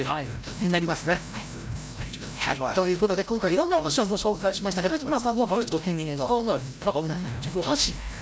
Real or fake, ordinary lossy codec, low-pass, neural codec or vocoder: fake; none; none; codec, 16 kHz, 0.5 kbps, FreqCodec, larger model